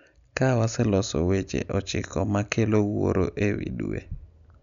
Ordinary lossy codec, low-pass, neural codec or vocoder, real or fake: none; 7.2 kHz; none; real